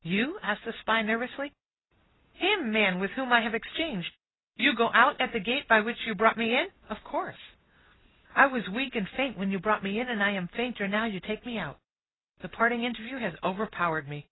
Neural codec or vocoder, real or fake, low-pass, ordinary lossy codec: none; real; 7.2 kHz; AAC, 16 kbps